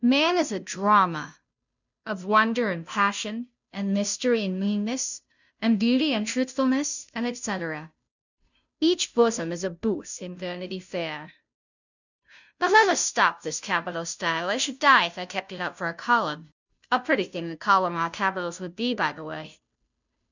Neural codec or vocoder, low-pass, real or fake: codec, 16 kHz, 0.5 kbps, FunCodec, trained on Chinese and English, 25 frames a second; 7.2 kHz; fake